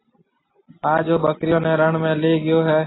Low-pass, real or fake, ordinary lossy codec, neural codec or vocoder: 7.2 kHz; real; AAC, 16 kbps; none